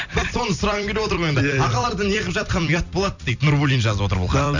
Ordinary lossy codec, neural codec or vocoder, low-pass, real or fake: none; vocoder, 44.1 kHz, 128 mel bands every 256 samples, BigVGAN v2; 7.2 kHz; fake